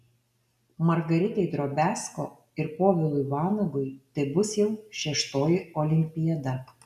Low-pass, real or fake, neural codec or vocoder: 14.4 kHz; real; none